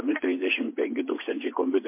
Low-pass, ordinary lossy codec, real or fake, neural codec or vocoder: 3.6 kHz; MP3, 24 kbps; fake; vocoder, 24 kHz, 100 mel bands, Vocos